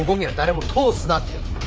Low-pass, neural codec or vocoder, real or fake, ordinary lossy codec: none; codec, 16 kHz, 4 kbps, FreqCodec, larger model; fake; none